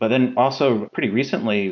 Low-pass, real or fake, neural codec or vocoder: 7.2 kHz; real; none